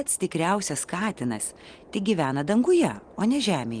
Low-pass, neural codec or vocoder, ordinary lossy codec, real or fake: 9.9 kHz; none; Opus, 24 kbps; real